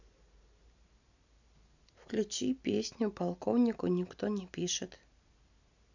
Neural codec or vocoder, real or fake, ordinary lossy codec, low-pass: none; real; none; 7.2 kHz